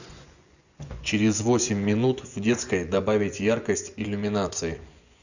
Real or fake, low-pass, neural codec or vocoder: fake; 7.2 kHz; vocoder, 24 kHz, 100 mel bands, Vocos